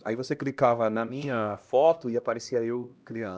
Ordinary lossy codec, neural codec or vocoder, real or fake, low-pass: none; codec, 16 kHz, 1 kbps, X-Codec, HuBERT features, trained on LibriSpeech; fake; none